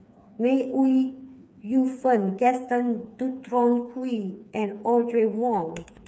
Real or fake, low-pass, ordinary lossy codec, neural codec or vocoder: fake; none; none; codec, 16 kHz, 4 kbps, FreqCodec, smaller model